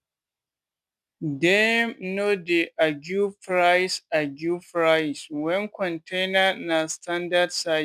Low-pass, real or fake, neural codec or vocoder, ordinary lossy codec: 14.4 kHz; real; none; none